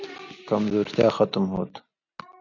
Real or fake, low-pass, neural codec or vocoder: real; 7.2 kHz; none